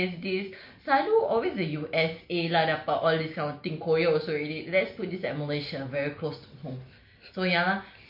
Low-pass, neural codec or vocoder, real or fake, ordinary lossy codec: 5.4 kHz; none; real; MP3, 32 kbps